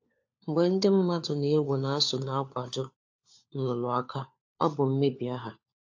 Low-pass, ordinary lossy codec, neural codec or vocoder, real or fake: 7.2 kHz; AAC, 48 kbps; codec, 16 kHz, 4 kbps, FunCodec, trained on LibriTTS, 50 frames a second; fake